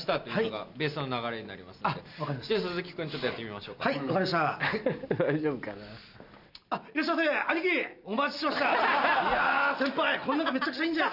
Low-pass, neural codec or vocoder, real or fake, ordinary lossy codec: 5.4 kHz; none; real; none